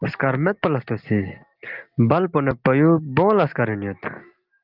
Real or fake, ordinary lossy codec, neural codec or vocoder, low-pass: real; Opus, 24 kbps; none; 5.4 kHz